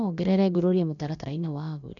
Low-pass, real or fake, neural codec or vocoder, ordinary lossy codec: 7.2 kHz; fake; codec, 16 kHz, about 1 kbps, DyCAST, with the encoder's durations; none